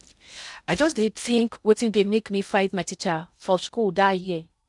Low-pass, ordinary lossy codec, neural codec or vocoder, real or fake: 10.8 kHz; none; codec, 16 kHz in and 24 kHz out, 0.6 kbps, FocalCodec, streaming, 2048 codes; fake